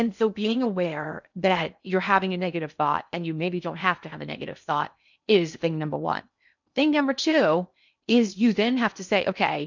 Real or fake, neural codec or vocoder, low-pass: fake; codec, 16 kHz in and 24 kHz out, 0.6 kbps, FocalCodec, streaming, 2048 codes; 7.2 kHz